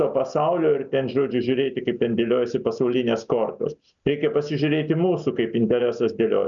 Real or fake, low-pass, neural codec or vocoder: real; 7.2 kHz; none